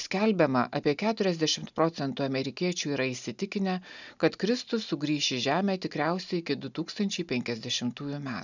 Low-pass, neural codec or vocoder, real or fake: 7.2 kHz; none; real